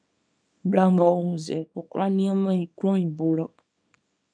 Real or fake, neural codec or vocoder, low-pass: fake; codec, 24 kHz, 0.9 kbps, WavTokenizer, small release; 9.9 kHz